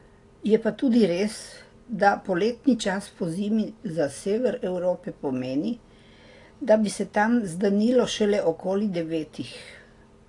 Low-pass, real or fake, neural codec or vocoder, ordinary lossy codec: 10.8 kHz; real; none; AAC, 48 kbps